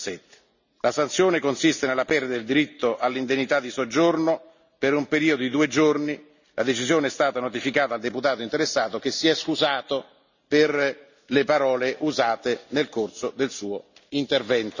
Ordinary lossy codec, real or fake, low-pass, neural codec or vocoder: none; real; 7.2 kHz; none